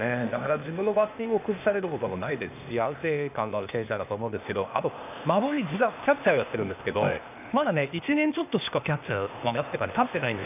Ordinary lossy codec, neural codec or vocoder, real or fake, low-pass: none; codec, 16 kHz, 0.8 kbps, ZipCodec; fake; 3.6 kHz